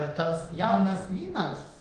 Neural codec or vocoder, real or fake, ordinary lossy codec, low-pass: codec, 24 kHz, 0.9 kbps, DualCodec; fake; Opus, 24 kbps; 10.8 kHz